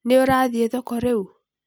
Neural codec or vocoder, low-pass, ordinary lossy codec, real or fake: none; none; none; real